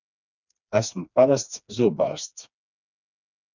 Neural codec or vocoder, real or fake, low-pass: codec, 16 kHz, 2 kbps, FreqCodec, smaller model; fake; 7.2 kHz